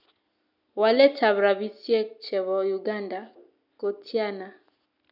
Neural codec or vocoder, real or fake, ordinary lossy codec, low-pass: none; real; none; 5.4 kHz